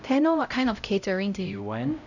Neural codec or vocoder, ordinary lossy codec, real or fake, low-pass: codec, 16 kHz, 0.5 kbps, X-Codec, HuBERT features, trained on LibriSpeech; none; fake; 7.2 kHz